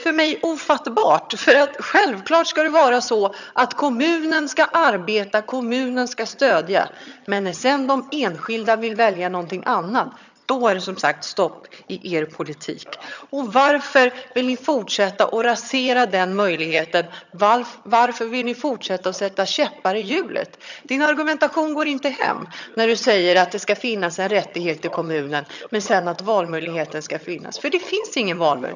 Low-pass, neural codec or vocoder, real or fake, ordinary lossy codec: 7.2 kHz; vocoder, 22.05 kHz, 80 mel bands, HiFi-GAN; fake; none